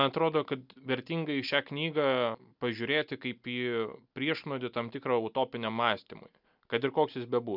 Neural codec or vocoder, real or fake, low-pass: none; real; 5.4 kHz